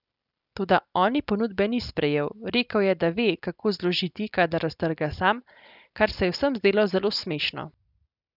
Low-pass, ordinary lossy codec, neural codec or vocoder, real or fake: 5.4 kHz; none; none; real